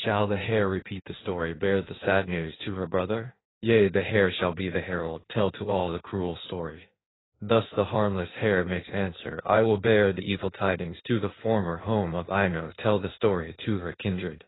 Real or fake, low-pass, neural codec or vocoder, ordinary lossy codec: fake; 7.2 kHz; codec, 16 kHz in and 24 kHz out, 1.1 kbps, FireRedTTS-2 codec; AAC, 16 kbps